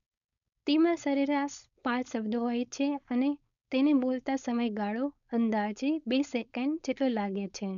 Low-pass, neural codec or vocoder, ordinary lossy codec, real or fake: 7.2 kHz; codec, 16 kHz, 4.8 kbps, FACodec; none; fake